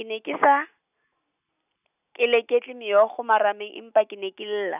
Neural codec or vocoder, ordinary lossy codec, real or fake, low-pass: none; none; real; 3.6 kHz